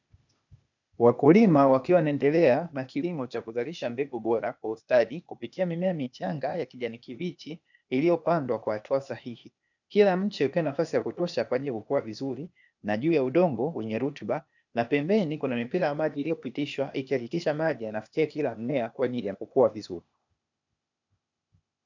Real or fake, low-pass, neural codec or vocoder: fake; 7.2 kHz; codec, 16 kHz, 0.8 kbps, ZipCodec